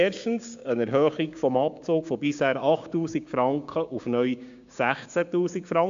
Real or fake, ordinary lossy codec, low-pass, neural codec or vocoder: fake; MP3, 64 kbps; 7.2 kHz; codec, 16 kHz, 6 kbps, DAC